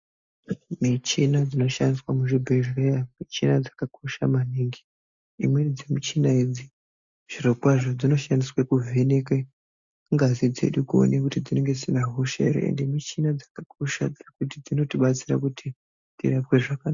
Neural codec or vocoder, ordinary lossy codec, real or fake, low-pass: none; MP3, 64 kbps; real; 7.2 kHz